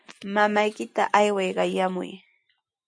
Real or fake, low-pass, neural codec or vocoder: real; 9.9 kHz; none